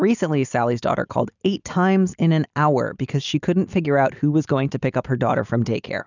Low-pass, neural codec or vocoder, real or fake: 7.2 kHz; none; real